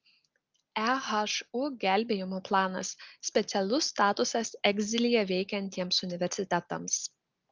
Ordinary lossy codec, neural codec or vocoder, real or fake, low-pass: Opus, 24 kbps; none; real; 7.2 kHz